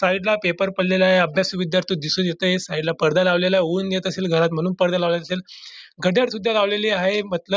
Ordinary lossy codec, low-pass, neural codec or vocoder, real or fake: none; none; none; real